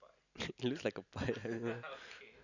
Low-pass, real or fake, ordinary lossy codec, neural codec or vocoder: 7.2 kHz; real; none; none